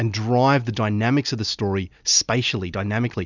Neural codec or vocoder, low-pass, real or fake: none; 7.2 kHz; real